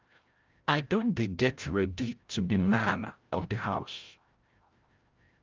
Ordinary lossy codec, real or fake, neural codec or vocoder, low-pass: Opus, 24 kbps; fake; codec, 16 kHz, 0.5 kbps, FreqCodec, larger model; 7.2 kHz